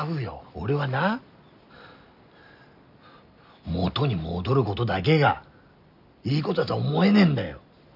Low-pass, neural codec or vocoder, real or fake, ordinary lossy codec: 5.4 kHz; none; real; none